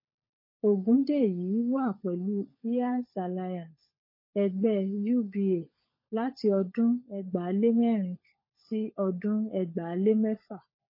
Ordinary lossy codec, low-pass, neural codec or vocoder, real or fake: MP3, 24 kbps; 5.4 kHz; codec, 16 kHz, 16 kbps, FunCodec, trained on LibriTTS, 50 frames a second; fake